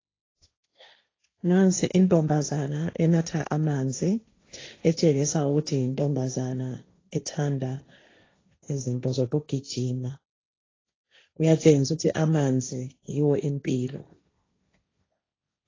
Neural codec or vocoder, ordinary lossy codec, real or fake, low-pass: codec, 16 kHz, 1.1 kbps, Voila-Tokenizer; AAC, 32 kbps; fake; 7.2 kHz